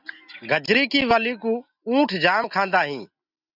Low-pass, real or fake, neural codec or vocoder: 5.4 kHz; real; none